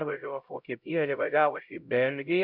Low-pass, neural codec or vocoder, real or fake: 5.4 kHz; codec, 16 kHz, 0.5 kbps, X-Codec, HuBERT features, trained on LibriSpeech; fake